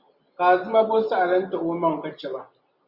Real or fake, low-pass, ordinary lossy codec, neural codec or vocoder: real; 5.4 kHz; Opus, 64 kbps; none